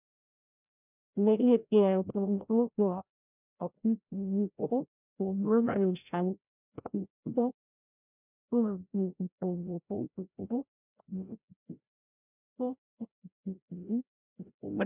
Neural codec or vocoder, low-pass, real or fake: codec, 16 kHz, 0.5 kbps, FreqCodec, larger model; 3.6 kHz; fake